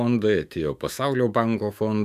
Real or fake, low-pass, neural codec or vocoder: fake; 14.4 kHz; autoencoder, 48 kHz, 128 numbers a frame, DAC-VAE, trained on Japanese speech